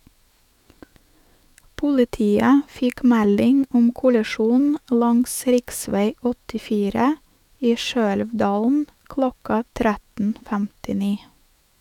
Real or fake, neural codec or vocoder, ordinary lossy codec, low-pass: fake; autoencoder, 48 kHz, 128 numbers a frame, DAC-VAE, trained on Japanese speech; none; 19.8 kHz